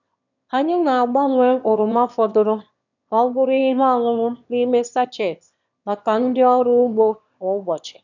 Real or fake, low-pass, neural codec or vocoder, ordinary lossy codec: fake; 7.2 kHz; autoencoder, 22.05 kHz, a latent of 192 numbers a frame, VITS, trained on one speaker; none